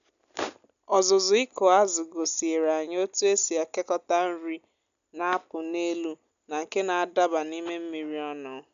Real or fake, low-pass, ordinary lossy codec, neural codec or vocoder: real; 7.2 kHz; none; none